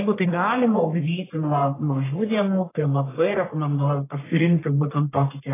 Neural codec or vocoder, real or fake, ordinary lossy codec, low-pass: codec, 44.1 kHz, 1.7 kbps, Pupu-Codec; fake; AAC, 16 kbps; 3.6 kHz